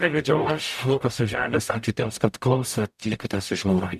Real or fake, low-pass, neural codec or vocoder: fake; 14.4 kHz; codec, 44.1 kHz, 0.9 kbps, DAC